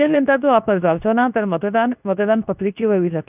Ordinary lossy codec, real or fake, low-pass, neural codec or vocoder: none; fake; 3.6 kHz; codec, 24 kHz, 0.9 kbps, WavTokenizer, medium speech release version 2